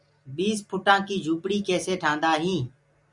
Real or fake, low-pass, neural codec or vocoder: real; 10.8 kHz; none